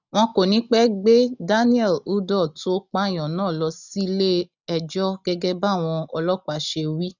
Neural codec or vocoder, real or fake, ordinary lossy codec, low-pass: none; real; none; 7.2 kHz